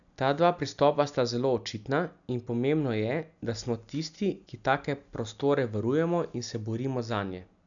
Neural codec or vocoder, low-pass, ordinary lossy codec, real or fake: none; 7.2 kHz; none; real